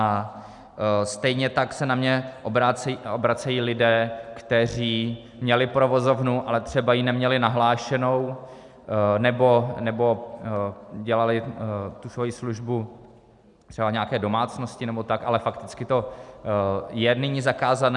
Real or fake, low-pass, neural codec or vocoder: real; 10.8 kHz; none